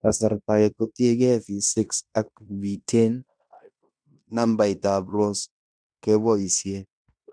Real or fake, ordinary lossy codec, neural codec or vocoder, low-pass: fake; none; codec, 16 kHz in and 24 kHz out, 0.9 kbps, LongCat-Audio-Codec, fine tuned four codebook decoder; 9.9 kHz